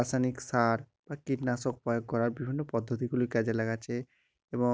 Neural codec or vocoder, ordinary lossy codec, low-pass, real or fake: none; none; none; real